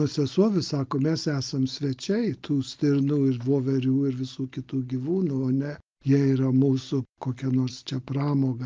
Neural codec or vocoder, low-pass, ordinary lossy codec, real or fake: none; 7.2 kHz; Opus, 24 kbps; real